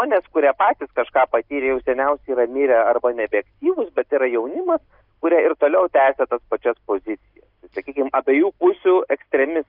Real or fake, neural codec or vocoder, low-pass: real; none; 5.4 kHz